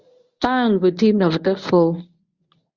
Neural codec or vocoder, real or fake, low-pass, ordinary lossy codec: codec, 24 kHz, 0.9 kbps, WavTokenizer, medium speech release version 1; fake; 7.2 kHz; Opus, 64 kbps